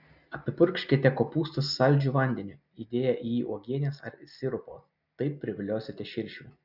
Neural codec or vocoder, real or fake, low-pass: none; real; 5.4 kHz